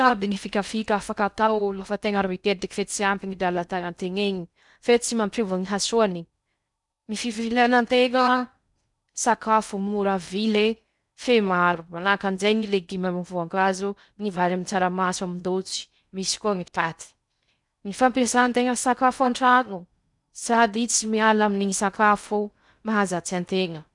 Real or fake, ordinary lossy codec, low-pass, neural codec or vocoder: fake; none; 10.8 kHz; codec, 16 kHz in and 24 kHz out, 0.6 kbps, FocalCodec, streaming, 2048 codes